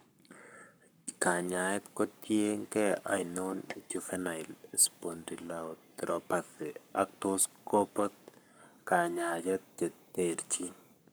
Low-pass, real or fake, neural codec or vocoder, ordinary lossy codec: none; fake; codec, 44.1 kHz, 7.8 kbps, Pupu-Codec; none